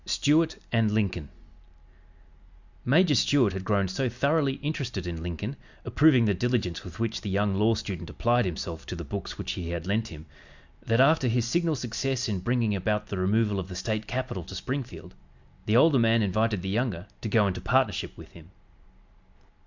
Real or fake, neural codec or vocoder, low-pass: real; none; 7.2 kHz